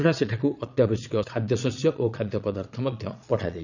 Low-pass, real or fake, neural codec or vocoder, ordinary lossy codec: 7.2 kHz; fake; vocoder, 22.05 kHz, 80 mel bands, Vocos; none